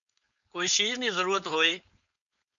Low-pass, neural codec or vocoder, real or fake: 7.2 kHz; codec, 16 kHz, 4.8 kbps, FACodec; fake